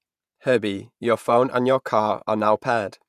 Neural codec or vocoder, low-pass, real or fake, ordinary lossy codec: vocoder, 48 kHz, 128 mel bands, Vocos; 14.4 kHz; fake; none